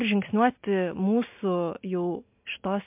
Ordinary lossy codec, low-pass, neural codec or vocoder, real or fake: MP3, 32 kbps; 3.6 kHz; none; real